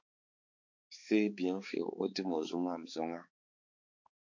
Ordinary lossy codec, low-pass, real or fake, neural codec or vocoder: MP3, 48 kbps; 7.2 kHz; fake; codec, 16 kHz, 4 kbps, X-Codec, HuBERT features, trained on balanced general audio